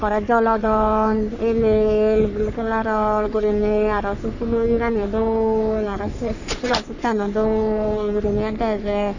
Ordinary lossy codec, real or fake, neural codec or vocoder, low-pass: none; fake; codec, 44.1 kHz, 3.4 kbps, Pupu-Codec; 7.2 kHz